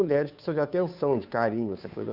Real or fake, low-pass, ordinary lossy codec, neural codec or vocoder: fake; 5.4 kHz; none; codec, 16 kHz, 2 kbps, FunCodec, trained on Chinese and English, 25 frames a second